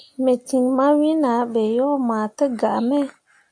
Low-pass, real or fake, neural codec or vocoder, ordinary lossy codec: 9.9 kHz; real; none; AAC, 64 kbps